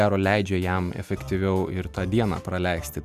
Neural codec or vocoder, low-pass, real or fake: none; 14.4 kHz; real